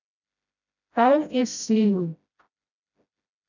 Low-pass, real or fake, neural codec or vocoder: 7.2 kHz; fake; codec, 16 kHz, 0.5 kbps, FreqCodec, smaller model